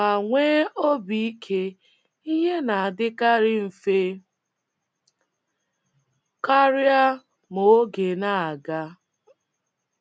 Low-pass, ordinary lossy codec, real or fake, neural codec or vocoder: none; none; real; none